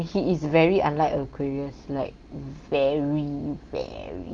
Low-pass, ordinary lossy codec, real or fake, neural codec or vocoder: 9.9 kHz; Opus, 24 kbps; real; none